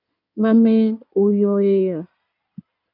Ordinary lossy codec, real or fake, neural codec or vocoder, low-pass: AAC, 48 kbps; fake; codec, 16 kHz in and 24 kHz out, 2.2 kbps, FireRedTTS-2 codec; 5.4 kHz